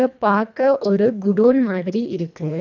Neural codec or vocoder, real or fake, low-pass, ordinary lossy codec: codec, 24 kHz, 1.5 kbps, HILCodec; fake; 7.2 kHz; none